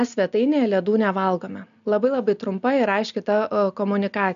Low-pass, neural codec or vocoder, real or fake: 7.2 kHz; none; real